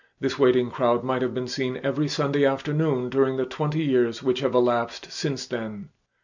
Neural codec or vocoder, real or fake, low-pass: none; real; 7.2 kHz